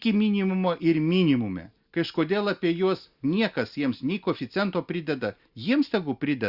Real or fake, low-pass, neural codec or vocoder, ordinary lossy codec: real; 5.4 kHz; none; Opus, 64 kbps